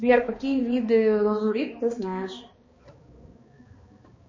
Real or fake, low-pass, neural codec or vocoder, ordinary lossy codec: fake; 7.2 kHz; codec, 16 kHz, 2 kbps, X-Codec, HuBERT features, trained on balanced general audio; MP3, 32 kbps